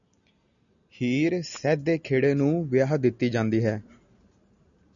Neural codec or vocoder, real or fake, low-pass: none; real; 7.2 kHz